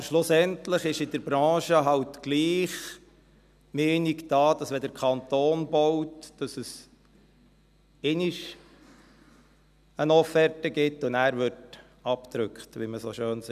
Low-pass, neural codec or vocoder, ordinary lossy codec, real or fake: 14.4 kHz; none; none; real